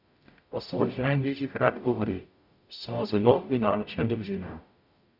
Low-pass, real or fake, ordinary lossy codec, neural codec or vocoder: 5.4 kHz; fake; none; codec, 44.1 kHz, 0.9 kbps, DAC